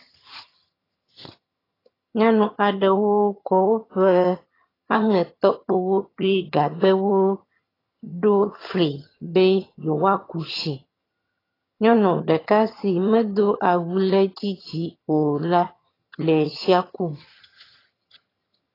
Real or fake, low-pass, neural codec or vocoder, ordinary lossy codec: fake; 5.4 kHz; vocoder, 22.05 kHz, 80 mel bands, HiFi-GAN; AAC, 24 kbps